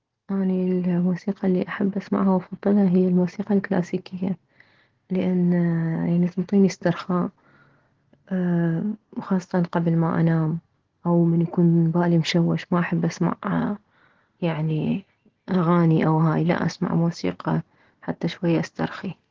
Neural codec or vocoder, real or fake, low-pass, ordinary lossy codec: none; real; 7.2 kHz; Opus, 16 kbps